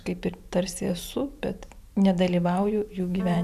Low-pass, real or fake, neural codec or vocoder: 14.4 kHz; fake; vocoder, 44.1 kHz, 128 mel bands every 512 samples, BigVGAN v2